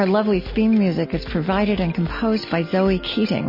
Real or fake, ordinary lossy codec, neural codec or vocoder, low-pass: real; MP3, 24 kbps; none; 5.4 kHz